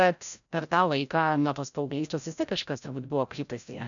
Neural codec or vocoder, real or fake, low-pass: codec, 16 kHz, 0.5 kbps, FreqCodec, larger model; fake; 7.2 kHz